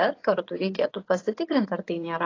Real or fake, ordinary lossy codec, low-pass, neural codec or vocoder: fake; AAC, 32 kbps; 7.2 kHz; vocoder, 24 kHz, 100 mel bands, Vocos